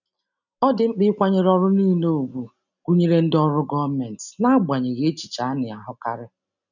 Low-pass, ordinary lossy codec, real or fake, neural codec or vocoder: 7.2 kHz; none; real; none